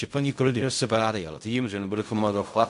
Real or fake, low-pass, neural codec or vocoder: fake; 10.8 kHz; codec, 16 kHz in and 24 kHz out, 0.4 kbps, LongCat-Audio-Codec, fine tuned four codebook decoder